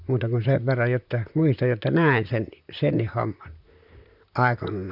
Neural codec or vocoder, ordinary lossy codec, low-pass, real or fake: vocoder, 44.1 kHz, 128 mel bands, Pupu-Vocoder; none; 5.4 kHz; fake